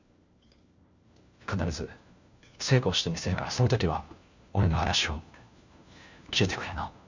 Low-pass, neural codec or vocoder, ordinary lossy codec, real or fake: 7.2 kHz; codec, 16 kHz, 1 kbps, FunCodec, trained on LibriTTS, 50 frames a second; Opus, 64 kbps; fake